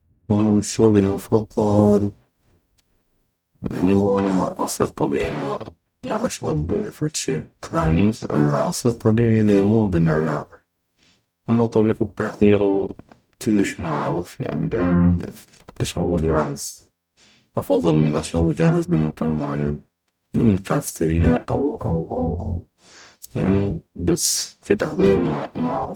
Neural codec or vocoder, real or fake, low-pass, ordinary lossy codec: codec, 44.1 kHz, 0.9 kbps, DAC; fake; 19.8 kHz; none